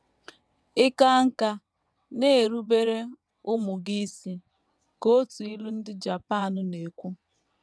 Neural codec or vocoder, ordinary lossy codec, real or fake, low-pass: vocoder, 22.05 kHz, 80 mel bands, WaveNeXt; none; fake; none